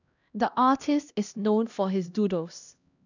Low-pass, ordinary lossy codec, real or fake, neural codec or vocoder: 7.2 kHz; none; fake; codec, 16 kHz, 1 kbps, X-Codec, HuBERT features, trained on LibriSpeech